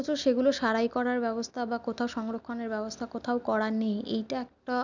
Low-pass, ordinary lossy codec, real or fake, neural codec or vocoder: 7.2 kHz; none; real; none